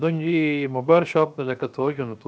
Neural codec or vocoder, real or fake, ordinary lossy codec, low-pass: codec, 16 kHz, 0.7 kbps, FocalCodec; fake; none; none